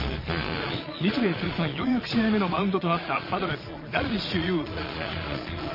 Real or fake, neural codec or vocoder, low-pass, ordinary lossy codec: fake; vocoder, 22.05 kHz, 80 mel bands, Vocos; 5.4 kHz; MP3, 24 kbps